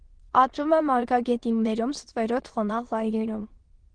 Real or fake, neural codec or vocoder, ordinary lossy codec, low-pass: fake; autoencoder, 22.05 kHz, a latent of 192 numbers a frame, VITS, trained on many speakers; Opus, 16 kbps; 9.9 kHz